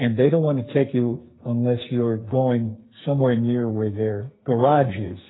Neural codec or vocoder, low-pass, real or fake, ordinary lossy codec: codec, 44.1 kHz, 2.6 kbps, SNAC; 7.2 kHz; fake; AAC, 16 kbps